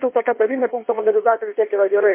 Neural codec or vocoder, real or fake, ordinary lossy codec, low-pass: codec, 16 kHz in and 24 kHz out, 1.1 kbps, FireRedTTS-2 codec; fake; MP3, 24 kbps; 3.6 kHz